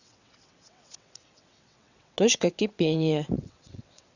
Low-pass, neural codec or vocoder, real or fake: 7.2 kHz; vocoder, 22.05 kHz, 80 mel bands, WaveNeXt; fake